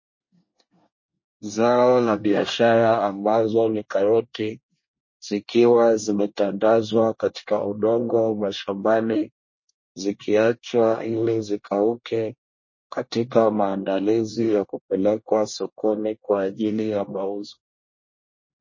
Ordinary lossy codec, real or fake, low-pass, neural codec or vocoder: MP3, 32 kbps; fake; 7.2 kHz; codec, 24 kHz, 1 kbps, SNAC